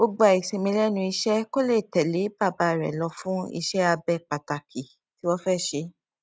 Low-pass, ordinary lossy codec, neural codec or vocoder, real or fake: none; none; none; real